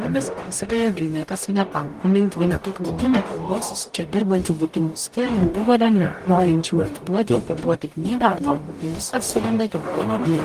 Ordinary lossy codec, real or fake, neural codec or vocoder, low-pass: Opus, 24 kbps; fake; codec, 44.1 kHz, 0.9 kbps, DAC; 14.4 kHz